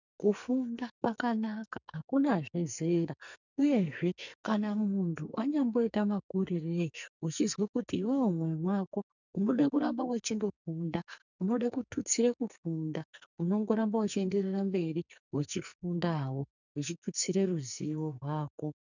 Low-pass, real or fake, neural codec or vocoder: 7.2 kHz; fake; codec, 44.1 kHz, 2.6 kbps, SNAC